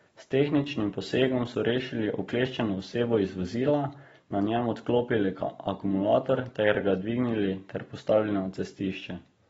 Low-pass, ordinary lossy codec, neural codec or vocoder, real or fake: 19.8 kHz; AAC, 24 kbps; none; real